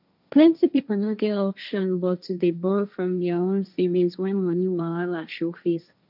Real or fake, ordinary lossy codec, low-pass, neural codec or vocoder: fake; none; 5.4 kHz; codec, 16 kHz, 1.1 kbps, Voila-Tokenizer